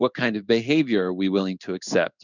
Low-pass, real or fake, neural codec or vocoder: 7.2 kHz; fake; codec, 16 kHz in and 24 kHz out, 1 kbps, XY-Tokenizer